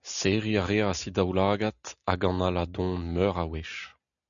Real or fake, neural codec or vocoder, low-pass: real; none; 7.2 kHz